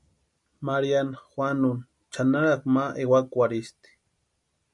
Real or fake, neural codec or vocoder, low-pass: real; none; 10.8 kHz